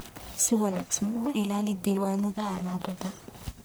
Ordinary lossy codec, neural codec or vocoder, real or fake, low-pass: none; codec, 44.1 kHz, 1.7 kbps, Pupu-Codec; fake; none